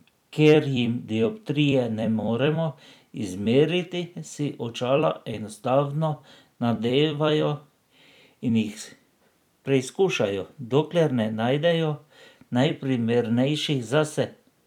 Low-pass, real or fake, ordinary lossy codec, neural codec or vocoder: 19.8 kHz; fake; none; vocoder, 44.1 kHz, 128 mel bands every 256 samples, BigVGAN v2